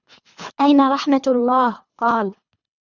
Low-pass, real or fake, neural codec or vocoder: 7.2 kHz; fake; codec, 24 kHz, 3 kbps, HILCodec